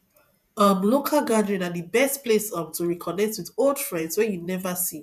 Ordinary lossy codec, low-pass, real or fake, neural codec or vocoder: none; 14.4 kHz; fake; vocoder, 48 kHz, 128 mel bands, Vocos